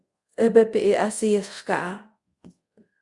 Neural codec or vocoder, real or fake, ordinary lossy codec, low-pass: codec, 24 kHz, 0.5 kbps, DualCodec; fake; Opus, 64 kbps; 10.8 kHz